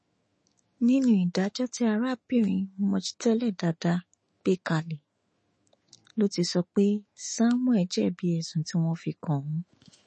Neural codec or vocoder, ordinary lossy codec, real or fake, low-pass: autoencoder, 48 kHz, 128 numbers a frame, DAC-VAE, trained on Japanese speech; MP3, 32 kbps; fake; 10.8 kHz